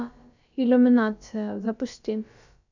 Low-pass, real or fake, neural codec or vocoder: 7.2 kHz; fake; codec, 16 kHz, about 1 kbps, DyCAST, with the encoder's durations